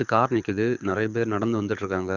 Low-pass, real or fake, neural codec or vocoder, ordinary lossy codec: 7.2 kHz; fake; codec, 24 kHz, 6 kbps, HILCodec; Opus, 64 kbps